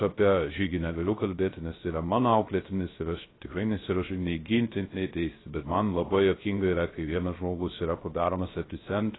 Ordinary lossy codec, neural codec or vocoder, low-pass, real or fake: AAC, 16 kbps; codec, 16 kHz, 0.3 kbps, FocalCodec; 7.2 kHz; fake